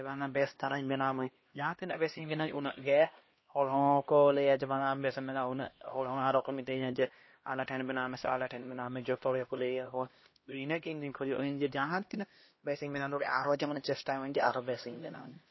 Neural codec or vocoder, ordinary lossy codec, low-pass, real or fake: codec, 16 kHz, 1 kbps, X-Codec, HuBERT features, trained on LibriSpeech; MP3, 24 kbps; 7.2 kHz; fake